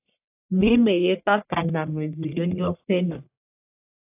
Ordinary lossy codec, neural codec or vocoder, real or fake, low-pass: AAC, 32 kbps; codec, 44.1 kHz, 1.7 kbps, Pupu-Codec; fake; 3.6 kHz